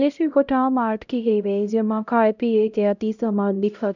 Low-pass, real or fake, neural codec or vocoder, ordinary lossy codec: 7.2 kHz; fake; codec, 16 kHz, 0.5 kbps, X-Codec, HuBERT features, trained on LibriSpeech; none